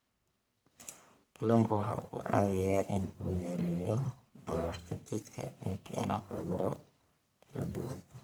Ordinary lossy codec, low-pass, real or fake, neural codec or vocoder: none; none; fake; codec, 44.1 kHz, 1.7 kbps, Pupu-Codec